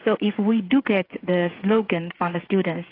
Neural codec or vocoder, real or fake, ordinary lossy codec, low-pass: codec, 16 kHz, 8 kbps, FreqCodec, smaller model; fake; AAC, 24 kbps; 5.4 kHz